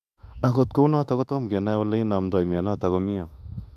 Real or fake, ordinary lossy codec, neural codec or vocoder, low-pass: fake; none; autoencoder, 48 kHz, 32 numbers a frame, DAC-VAE, trained on Japanese speech; 14.4 kHz